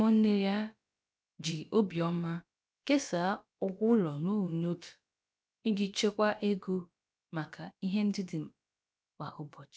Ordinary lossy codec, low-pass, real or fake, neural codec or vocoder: none; none; fake; codec, 16 kHz, about 1 kbps, DyCAST, with the encoder's durations